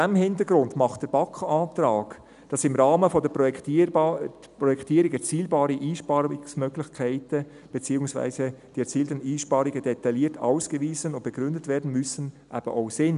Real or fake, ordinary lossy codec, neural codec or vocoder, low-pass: real; none; none; 10.8 kHz